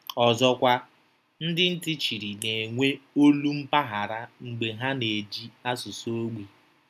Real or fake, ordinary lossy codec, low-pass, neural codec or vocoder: real; none; 14.4 kHz; none